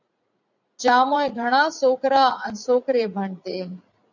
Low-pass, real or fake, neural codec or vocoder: 7.2 kHz; fake; vocoder, 44.1 kHz, 80 mel bands, Vocos